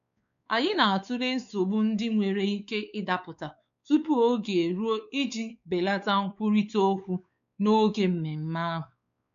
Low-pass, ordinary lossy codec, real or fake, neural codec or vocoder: 7.2 kHz; none; fake; codec, 16 kHz, 4 kbps, X-Codec, WavLM features, trained on Multilingual LibriSpeech